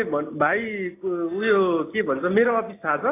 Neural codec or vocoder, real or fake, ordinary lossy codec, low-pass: none; real; AAC, 16 kbps; 3.6 kHz